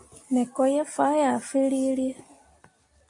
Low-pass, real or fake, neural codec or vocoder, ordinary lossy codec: 10.8 kHz; real; none; AAC, 48 kbps